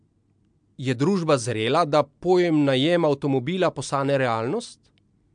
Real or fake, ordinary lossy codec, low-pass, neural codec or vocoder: real; MP3, 64 kbps; 9.9 kHz; none